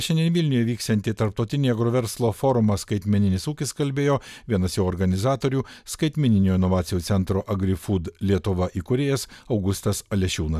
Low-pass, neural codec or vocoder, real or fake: 14.4 kHz; none; real